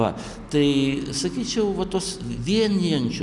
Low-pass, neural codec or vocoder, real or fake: 10.8 kHz; none; real